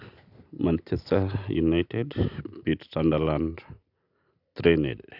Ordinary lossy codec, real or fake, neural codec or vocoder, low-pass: none; real; none; 5.4 kHz